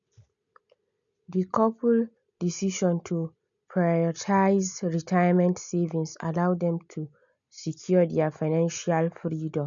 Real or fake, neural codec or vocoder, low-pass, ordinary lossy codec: real; none; 7.2 kHz; none